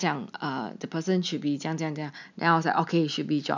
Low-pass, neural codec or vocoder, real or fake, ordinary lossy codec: 7.2 kHz; none; real; none